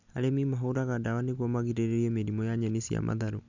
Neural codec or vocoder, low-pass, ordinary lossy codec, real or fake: none; 7.2 kHz; none; real